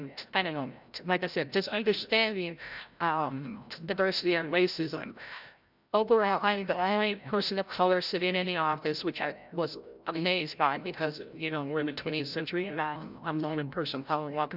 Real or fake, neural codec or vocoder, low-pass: fake; codec, 16 kHz, 0.5 kbps, FreqCodec, larger model; 5.4 kHz